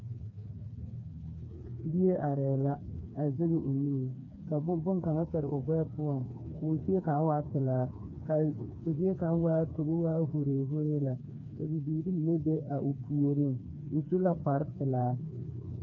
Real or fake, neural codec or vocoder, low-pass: fake; codec, 16 kHz, 4 kbps, FreqCodec, smaller model; 7.2 kHz